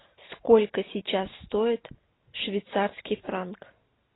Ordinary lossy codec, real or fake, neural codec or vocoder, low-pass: AAC, 16 kbps; real; none; 7.2 kHz